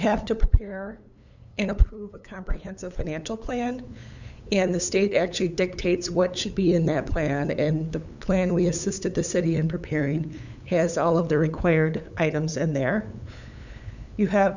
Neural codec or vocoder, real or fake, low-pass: codec, 16 kHz, 8 kbps, FunCodec, trained on LibriTTS, 25 frames a second; fake; 7.2 kHz